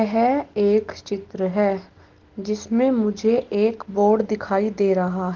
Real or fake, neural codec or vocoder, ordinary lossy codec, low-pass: real; none; Opus, 16 kbps; 7.2 kHz